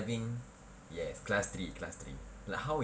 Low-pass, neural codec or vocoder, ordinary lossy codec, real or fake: none; none; none; real